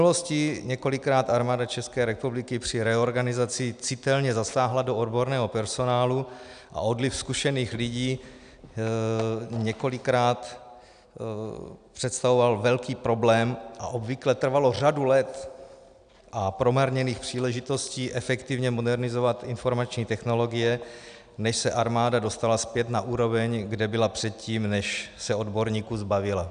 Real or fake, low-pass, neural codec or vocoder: real; 9.9 kHz; none